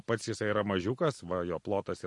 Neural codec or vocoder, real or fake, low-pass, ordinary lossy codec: none; real; 10.8 kHz; MP3, 48 kbps